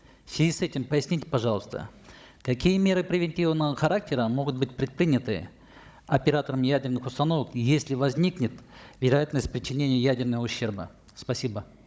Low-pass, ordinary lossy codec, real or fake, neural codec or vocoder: none; none; fake; codec, 16 kHz, 16 kbps, FunCodec, trained on Chinese and English, 50 frames a second